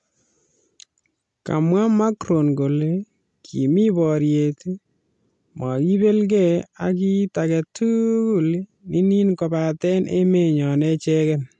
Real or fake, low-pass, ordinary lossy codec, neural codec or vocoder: real; 10.8 kHz; MP3, 64 kbps; none